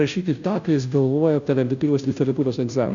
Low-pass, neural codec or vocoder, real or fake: 7.2 kHz; codec, 16 kHz, 0.5 kbps, FunCodec, trained on Chinese and English, 25 frames a second; fake